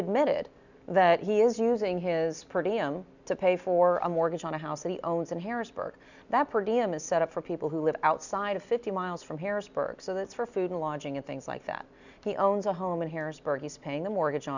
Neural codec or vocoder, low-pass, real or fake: none; 7.2 kHz; real